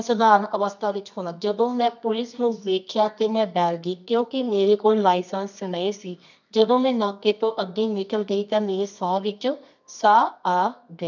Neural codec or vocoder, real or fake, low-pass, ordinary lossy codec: codec, 24 kHz, 0.9 kbps, WavTokenizer, medium music audio release; fake; 7.2 kHz; none